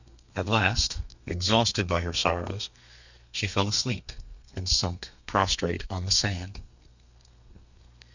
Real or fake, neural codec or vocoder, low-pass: fake; codec, 32 kHz, 1.9 kbps, SNAC; 7.2 kHz